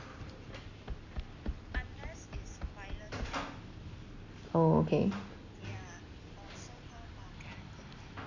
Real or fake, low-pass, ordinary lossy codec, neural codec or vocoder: real; 7.2 kHz; none; none